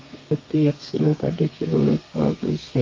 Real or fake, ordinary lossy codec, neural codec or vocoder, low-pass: fake; Opus, 32 kbps; codec, 32 kHz, 1.9 kbps, SNAC; 7.2 kHz